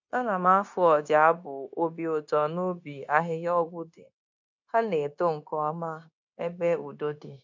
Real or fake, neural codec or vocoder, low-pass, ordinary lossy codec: fake; codec, 16 kHz, 0.9 kbps, LongCat-Audio-Codec; 7.2 kHz; MP3, 64 kbps